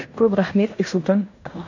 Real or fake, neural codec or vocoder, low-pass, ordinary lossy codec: fake; codec, 16 kHz in and 24 kHz out, 0.9 kbps, LongCat-Audio-Codec, fine tuned four codebook decoder; 7.2 kHz; none